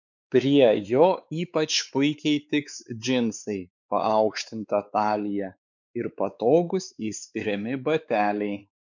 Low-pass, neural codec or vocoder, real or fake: 7.2 kHz; codec, 16 kHz, 4 kbps, X-Codec, WavLM features, trained on Multilingual LibriSpeech; fake